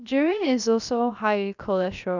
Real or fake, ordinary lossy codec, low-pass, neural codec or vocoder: fake; none; 7.2 kHz; codec, 16 kHz, about 1 kbps, DyCAST, with the encoder's durations